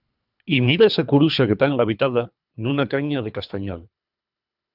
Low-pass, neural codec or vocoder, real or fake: 5.4 kHz; codec, 24 kHz, 3 kbps, HILCodec; fake